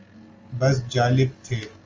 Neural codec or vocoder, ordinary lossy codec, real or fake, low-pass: none; Opus, 32 kbps; real; 7.2 kHz